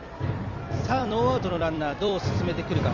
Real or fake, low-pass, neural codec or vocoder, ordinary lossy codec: fake; 7.2 kHz; vocoder, 44.1 kHz, 128 mel bands every 256 samples, BigVGAN v2; none